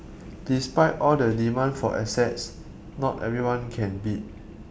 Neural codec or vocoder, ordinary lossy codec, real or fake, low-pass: none; none; real; none